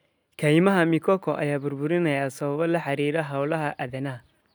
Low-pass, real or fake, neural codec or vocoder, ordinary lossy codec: none; real; none; none